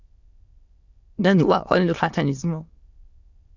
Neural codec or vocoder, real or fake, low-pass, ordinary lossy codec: autoencoder, 22.05 kHz, a latent of 192 numbers a frame, VITS, trained on many speakers; fake; 7.2 kHz; Opus, 64 kbps